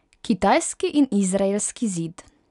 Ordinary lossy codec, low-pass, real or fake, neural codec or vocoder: none; 10.8 kHz; real; none